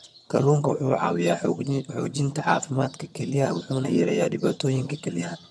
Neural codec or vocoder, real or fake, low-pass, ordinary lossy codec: vocoder, 22.05 kHz, 80 mel bands, HiFi-GAN; fake; none; none